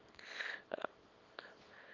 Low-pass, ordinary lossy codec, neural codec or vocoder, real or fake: 7.2 kHz; Opus, 24 kbps; codec, 24 kHz, 0.9 kbps, WavTokenizer, small release; fake